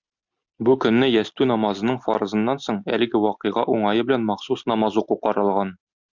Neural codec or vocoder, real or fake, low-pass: none; real; 7.2 kHz